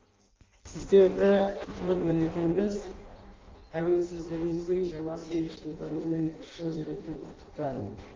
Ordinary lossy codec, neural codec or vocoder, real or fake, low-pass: Opus, 24 kbps; codec, 16 kHz in and 24 kHz out, 0.6 kbps, FireRedTTS-2 codec; fake; 7.2 kHz